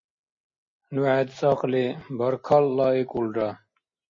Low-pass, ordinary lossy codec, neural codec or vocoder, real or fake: 7.2 kHz; MP3, 32 kbps; none; real